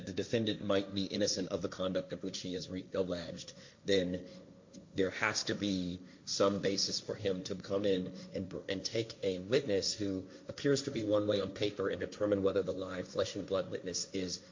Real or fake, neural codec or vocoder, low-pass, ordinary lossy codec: fake; codec, 16 kHz, 1.1 kbps, Voila-Tokenizer; 7.2 kHz; MP3, 48 kbps